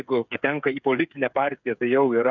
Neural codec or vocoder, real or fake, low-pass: codec, 16 kHz, 8 kbps, FreqCodec, smaller model; fake; 7.2 kHz